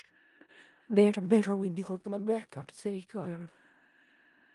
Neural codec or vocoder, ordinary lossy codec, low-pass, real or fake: codec, 16 kHz in and 24 kHz out, 0.4 kbps, LongCat-Audio-Codec, four codebook decoder; Opus, 32 kbps; 10.8 kHz; fake